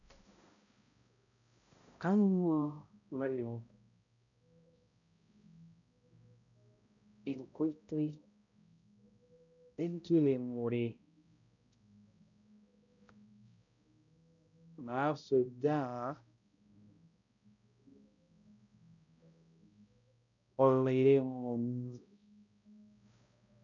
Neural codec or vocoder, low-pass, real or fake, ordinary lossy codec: codec, 16 kHz, 0.5 kbps, X-Codec, HuBERT features, trained on balanced general audio; 7.2 kHz; fake; none